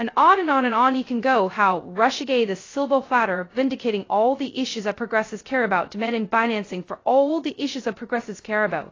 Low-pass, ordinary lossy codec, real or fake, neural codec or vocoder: 7.2 kHz; AAC, 32 kbps; fake; codec, 16 kHz, 0.2 kbps, FocalCodec